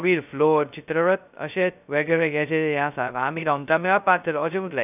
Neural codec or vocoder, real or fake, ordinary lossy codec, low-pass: codec, 16 kHz, 0.2 kbps, FocalCodec; fake; none; 3.6 kHz